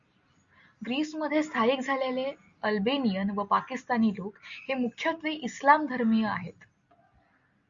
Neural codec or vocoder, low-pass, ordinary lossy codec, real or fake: none; 7.2 kHz; Opus, 64 kbps; real